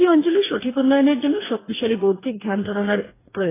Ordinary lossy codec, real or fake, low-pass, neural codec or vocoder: AAC, 16 kbps; fake; 3.6 kHz; codec, 44.1 kHz, 2.6 kbps, DAC